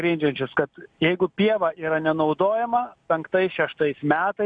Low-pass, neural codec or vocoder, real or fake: 9.9 kHz; none; real